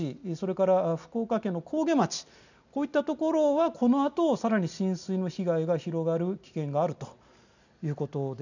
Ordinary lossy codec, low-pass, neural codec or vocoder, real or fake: none; 7.2 kHz; none; real